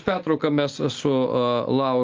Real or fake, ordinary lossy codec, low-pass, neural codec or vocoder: real; Opus, 32 kbps; 7.2 kHz; none